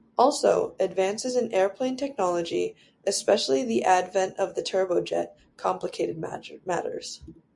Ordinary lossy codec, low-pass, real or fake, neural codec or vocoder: MP3, 64 kbps; 10.8 kHz; real; none